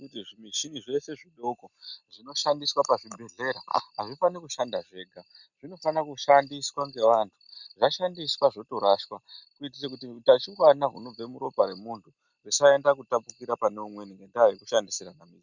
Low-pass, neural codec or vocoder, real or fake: 7.2 kHz; none; real